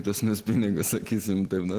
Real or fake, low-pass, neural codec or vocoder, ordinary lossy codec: real; 14.4 kHz; none; Opus, 16 kbps